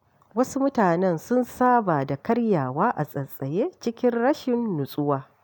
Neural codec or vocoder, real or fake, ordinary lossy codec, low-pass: none; real; none; none